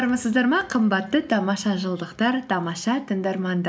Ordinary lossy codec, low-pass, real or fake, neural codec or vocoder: none; none; real; none